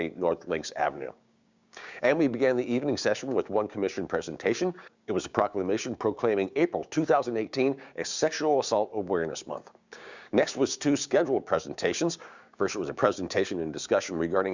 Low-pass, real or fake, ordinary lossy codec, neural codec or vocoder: 7.2 kHz; fake; Opus, 64 kbps; codec, 16 kHz, 6 kbps, DAC